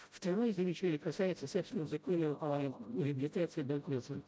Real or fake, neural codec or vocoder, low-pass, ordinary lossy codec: fake; codec, 16 kHz, 0.5 kbps, FreqCodec, smaller model; none; none